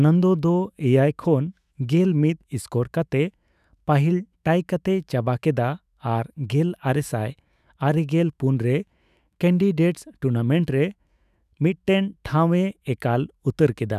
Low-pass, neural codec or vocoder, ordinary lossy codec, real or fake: 14.4 kHz; autoencoder, 48 kHz, 128 numbers a frame, DAC-VAE, trained on Japanese speech; none; fake